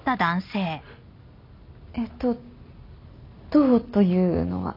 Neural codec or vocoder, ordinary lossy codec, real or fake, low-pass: none; none; real; 5.4 kHz